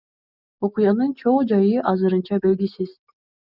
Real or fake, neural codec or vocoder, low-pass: real; none; 5.4 kHz